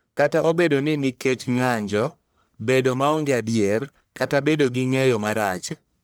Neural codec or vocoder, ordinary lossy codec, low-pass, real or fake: codec, 44.1 kHz, 1.7 kbps, Pupu-Codec; none; none; fake